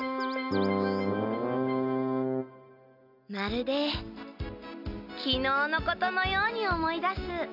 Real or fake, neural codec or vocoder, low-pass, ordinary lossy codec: real; none; 5.4 kHz; none